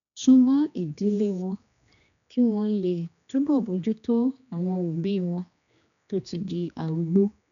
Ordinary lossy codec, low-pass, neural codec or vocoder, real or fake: none; 7.2 kHz; codec, 16 kHz, 2 kbps, X-Codec, HuBERT features, trained on general audio; fake